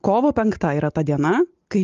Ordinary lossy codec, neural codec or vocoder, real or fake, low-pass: Opus, 32 kbps; none; real; 7.2 kHz